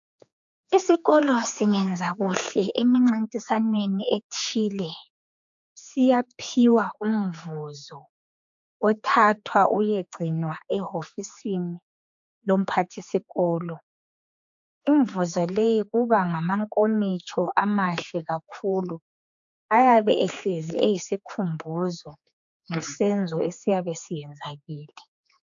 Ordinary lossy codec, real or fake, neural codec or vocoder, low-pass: AAC, 64 kbps; fake; codec, 16 kHz, 4 kbps, X-Codec, HuBERT features, trained on general audio; 7.2 kHz